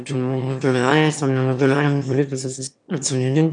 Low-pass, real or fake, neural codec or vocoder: 9.9 kHz; fake; autoencoder, 22.05 kHz, a latent of 192 numbers a frame, VITS, trained on one speaker